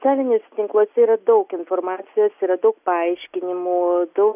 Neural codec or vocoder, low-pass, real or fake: none; 3.6 kHz; real